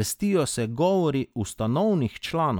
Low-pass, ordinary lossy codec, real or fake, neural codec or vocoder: none; none; real; none